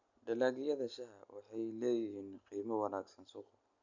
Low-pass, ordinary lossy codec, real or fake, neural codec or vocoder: 7.2 kHz; none; real; none